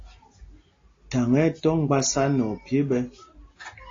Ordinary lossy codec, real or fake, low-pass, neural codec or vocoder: AAC, 32 kbps; real; 7.2 kHz; none